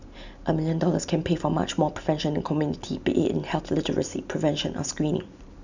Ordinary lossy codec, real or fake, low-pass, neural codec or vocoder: none; real; 7.2 kHz; none